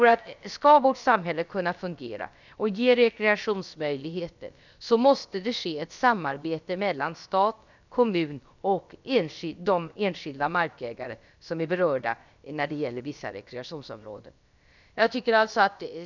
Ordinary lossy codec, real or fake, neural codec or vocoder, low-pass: none; fake; codec, 16 kHz, about 1 kbps, DyCAST, with the encoder's durations; 7.2 kHz